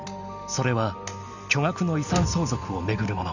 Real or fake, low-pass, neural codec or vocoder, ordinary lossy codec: real; 7.2 kHz; none; none